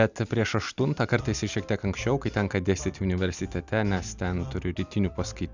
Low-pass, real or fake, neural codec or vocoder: 7.2 kHz; real; none